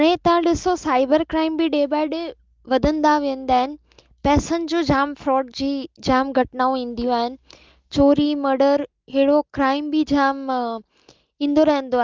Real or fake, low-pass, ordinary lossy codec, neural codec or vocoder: real; 7.2 kHz; Opus, 32 kbps; none